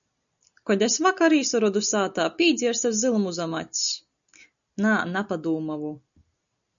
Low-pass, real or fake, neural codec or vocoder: 7.2 kHz; real; none